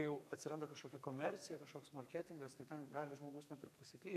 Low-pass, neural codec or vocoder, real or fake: 14.4 kHz; codec, 32 kHz, 1.9 kbps, SNAC; fake